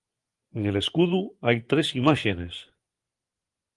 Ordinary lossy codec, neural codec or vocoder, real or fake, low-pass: Opus, 24 kbps; vocoder, 24 kHz, 100 mel bands, Vocos; fake; 10.8 kHz